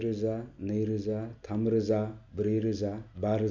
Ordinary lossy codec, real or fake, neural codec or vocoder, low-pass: none; real; none; 7.2 kHz